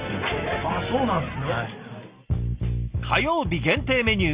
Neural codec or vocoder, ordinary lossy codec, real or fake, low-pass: none; Opus, 64 kbps; real; 3.6 kHz